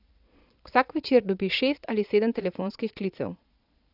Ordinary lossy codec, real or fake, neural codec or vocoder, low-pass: none; fake; vocoder, 22.05 kHz, 80 mel bands, Vocos; 5.4 kHz